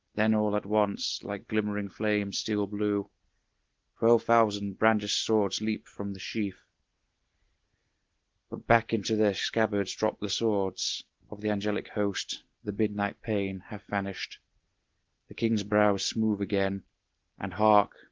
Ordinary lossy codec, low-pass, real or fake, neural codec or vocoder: Opus, 16 kbps; 7.2 kHz; real; none